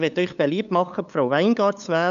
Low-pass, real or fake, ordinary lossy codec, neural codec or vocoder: 7.2 kHz; fake; none; codec, 16 kHz, 8 kbps, FunCodec, trained on LibriTTS, 25 frames a second